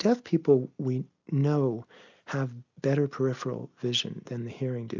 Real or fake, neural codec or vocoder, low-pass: real; none; 7.2 kHz